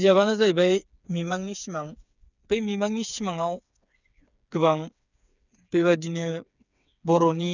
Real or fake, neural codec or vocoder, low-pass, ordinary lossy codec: fake; codec, 16 kHz, 4 kbps, FreqCodec, smaller model; 7.2 kHz; none